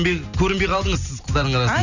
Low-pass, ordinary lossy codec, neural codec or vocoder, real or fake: 7.2 kHz; none; none; real